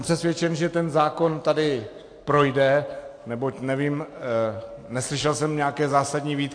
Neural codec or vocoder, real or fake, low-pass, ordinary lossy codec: none; real; 9.9 kHz; AAC, 48 kbps